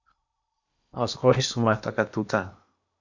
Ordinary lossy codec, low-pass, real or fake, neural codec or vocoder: Opus, 64 kbps; 7.2 kHz; fake; codec, 16 kHz in and 24 kHz out, 0.8 kbps, FocalCodec, streaming, 65536 codes